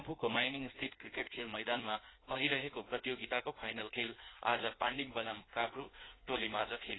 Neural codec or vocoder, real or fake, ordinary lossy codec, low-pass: codec, 16 kHz in and 24 kHz out, 1.1 kbps, FireRedTTS-2 codec; fake; AAC, 16 kbps; 7.2 kHz